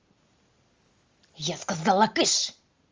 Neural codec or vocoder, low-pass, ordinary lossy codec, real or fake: none; 7.2 kHz; Opus, 32 kbps; real